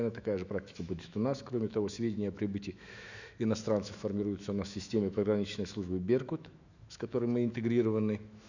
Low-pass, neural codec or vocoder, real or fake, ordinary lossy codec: 7.2 kHz; autoencoder, 48 kHz, 128 numbers a frame, DAC-VAE, trained on Japanese speech; fake; none